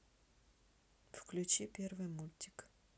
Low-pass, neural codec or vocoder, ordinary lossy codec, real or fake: none; none; none; real